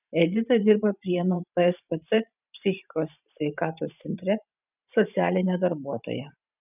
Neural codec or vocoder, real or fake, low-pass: vocoder, 44.1 kHz, 128 mel bands every 512 samples, BigVGAN v2; fake; 3.6 kHz